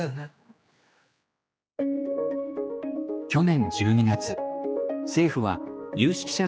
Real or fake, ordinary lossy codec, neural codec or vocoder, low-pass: fake; none; codec, 16 kHz, 2 kbps, X-Codec, HuBERT features, trained on general audio; none